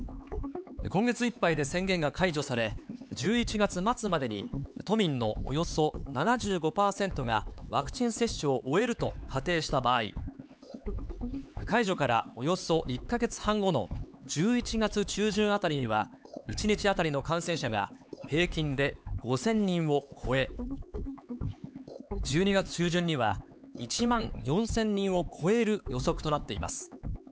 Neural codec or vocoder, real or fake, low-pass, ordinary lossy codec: codec, 16 kHz, 4 kbps, X-Codec, HuBERT features, trained on LibriSpeech; fake; none; none